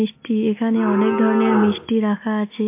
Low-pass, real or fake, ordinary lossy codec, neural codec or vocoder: 3.6 kHz; real; MP3, 24 kbps; none